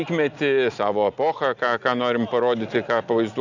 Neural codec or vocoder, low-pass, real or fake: none; 7.2 kHz; real